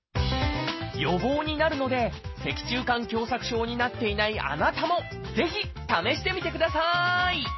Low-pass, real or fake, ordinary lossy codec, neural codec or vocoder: 7.2 kHz; real; MP3, 24 kbps; none